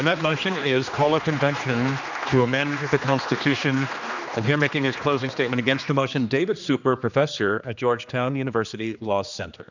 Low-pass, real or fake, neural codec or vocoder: 7.2 kHz; fake; codec, 16 kHz, 2 kbps, X-Codec, HuBERT features, trained on general audio